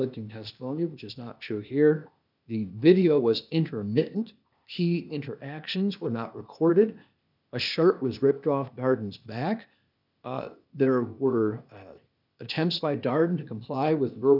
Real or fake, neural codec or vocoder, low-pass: fake; codec, 16 kHz, 0.8 kbps, ZipCodec; 5.4 kHz